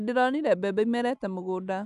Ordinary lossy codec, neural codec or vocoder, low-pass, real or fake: none; none; 10.8 kHz; real